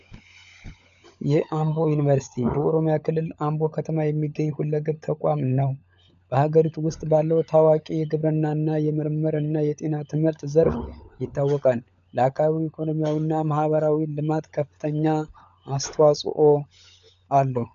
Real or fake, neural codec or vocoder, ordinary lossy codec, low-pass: fake; codec, 16 kHz, 16 kbps, FunCodec, trained on LibriTTS, 50 frames a second; MP3, 96 kbps; 7.2 kHz